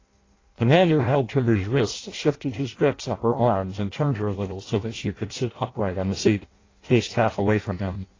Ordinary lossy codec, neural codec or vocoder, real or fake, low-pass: AAC, 32 kbps; codec, 16 kHz in and 24 kHz out, 0.6 kbps, FireRedTTS-2 codec; fake; 7.2 kHz